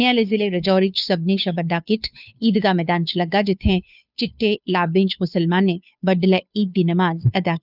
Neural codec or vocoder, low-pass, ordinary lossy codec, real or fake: codec, 16 kHz, 2 kbps, FunCodec, trained on Chinese and English, 25 frames a second; 5.4 kHz; none; fake